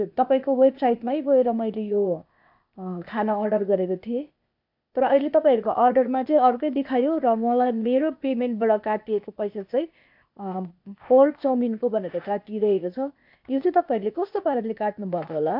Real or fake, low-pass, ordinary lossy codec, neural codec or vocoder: fake; 5.4 kHz; MP3, 48 kbps; codec, 16 kHz, 0.8 kbps, ZipCodec